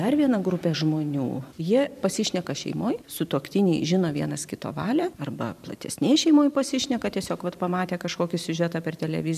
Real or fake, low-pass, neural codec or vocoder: fake; 14.4 kHz; vocoder, 44.1 kHz, 128 mel bands every 512 samples, BigVGAN v2